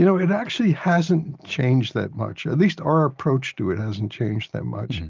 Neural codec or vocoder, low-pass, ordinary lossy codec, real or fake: none; 7.2 kHz; Opus, 32 kbps; real